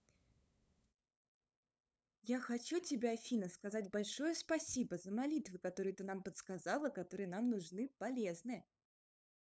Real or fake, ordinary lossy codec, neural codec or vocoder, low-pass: fake; none; codec, 16 kHz, 8 kbps, FunCodec, trained on LibriTTS, 25 frames a second; none